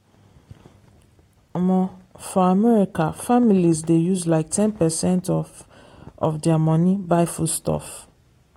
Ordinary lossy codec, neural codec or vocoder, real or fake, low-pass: AAC, 48 kbps; none; real; 19.8 kHz